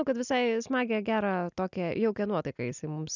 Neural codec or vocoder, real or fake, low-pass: none; real; 7.2 kHz